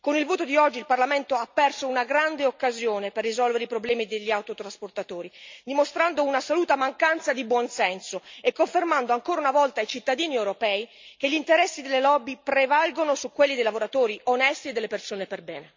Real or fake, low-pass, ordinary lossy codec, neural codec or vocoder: real; 7.2 kHz; MP3, 64 kbps; none